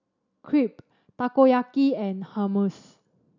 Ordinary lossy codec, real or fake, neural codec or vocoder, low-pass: none; real; none; 7.2 kHz